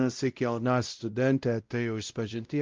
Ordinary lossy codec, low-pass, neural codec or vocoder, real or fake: Opus, 24 kbps; 7.2 kHz; codec, 16 kHz, 0.5 kbps, X-Codec, WavLM features, trained on Multilingual LibriSpeech; fake